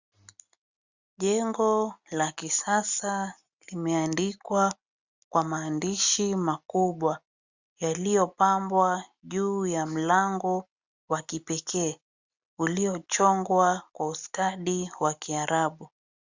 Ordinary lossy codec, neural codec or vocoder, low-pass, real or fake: Opus, 64 kbps; none; 7.2 kHz; real